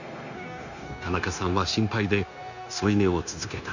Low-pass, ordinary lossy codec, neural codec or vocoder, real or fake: 7.2 kHz; none; codec, 16 kHz, 0.9 kbps, LongCat-Audio-Codec; fake